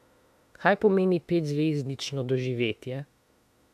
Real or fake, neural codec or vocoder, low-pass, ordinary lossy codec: fake; autoencoder, 48 kHz, 32 numbers a frame, DAC-VAE, trained on Japanese speech; 14.4 kHz; AAC, 96 kbps